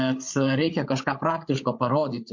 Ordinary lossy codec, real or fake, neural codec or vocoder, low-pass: MP3, 48 kbps; fake; codec, 16 kHz, 8 kbps, FreqCodec, larger model; 7.2 kHz